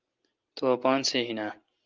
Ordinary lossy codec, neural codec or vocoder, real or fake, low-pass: Opus, 32 kbps; none; real; 7.2 kHz